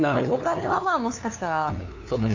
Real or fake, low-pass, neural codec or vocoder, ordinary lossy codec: fake; 7.2 kHz; codec, 16 kHz, 2 kbps, FunCodec, trained on LibriTTS, 25 frames a second; AAC, 48 kbps